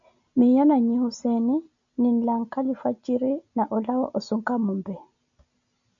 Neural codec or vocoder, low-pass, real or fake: none; 7.2 kHz; real